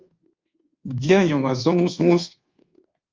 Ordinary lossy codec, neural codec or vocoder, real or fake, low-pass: Opus, 32 kbps; codec, 24 kHz, 1.2 kbps, DualCodec; fake; 7.2 kHz